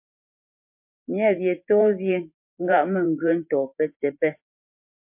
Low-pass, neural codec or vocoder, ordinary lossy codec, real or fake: 3.6 kHz; vocoder, 44.1 kHz, 128 mel bands every 512 samples, BigVGAN v2; MP3, 32 kbps; fake